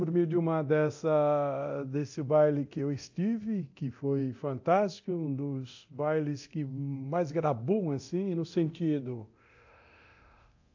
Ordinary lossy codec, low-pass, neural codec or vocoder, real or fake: AAC, 48 kbps; 7.2 kHz; codec, 24 kHz, 0.9 kbps, DualCodec; fake